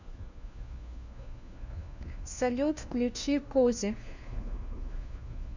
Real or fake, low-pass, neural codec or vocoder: fake; 7.2 kHz; codec, 16 kHz, 1 kbps, FunCodec, trained on LibriTTS, 50 frames a second